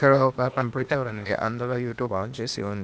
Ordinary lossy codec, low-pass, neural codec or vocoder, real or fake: none; none; codec, 16 kHz, 0.8 kbps, ZipCodec; fake